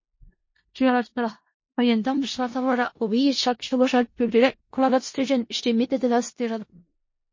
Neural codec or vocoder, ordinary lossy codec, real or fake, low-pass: codec, 16 kHz in and 24 kHz out, 0.4 kbps, LongCat-Audio-Codec, four codebook decoder; MP3, 32 kbps; fake; 7.2 kHz